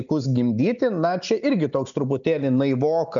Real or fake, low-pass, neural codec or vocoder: real; 7.2 kHz; none